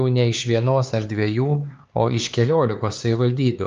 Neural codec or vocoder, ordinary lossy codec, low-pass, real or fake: codec, 16 kHz, 4 kbps, X-Codec, HuBERT features, trained on LibriSpeech; Opus, 24 kbps; 7.2 kHz; fake